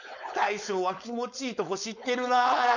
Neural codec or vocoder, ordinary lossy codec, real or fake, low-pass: codec, 16 kHz, 4.8 kbps, FACodec; none; fake; 7.2 kHz